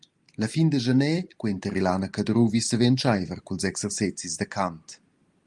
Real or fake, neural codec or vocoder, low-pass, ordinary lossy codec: real; none; 10.8 kHz; Opus, 32 kbps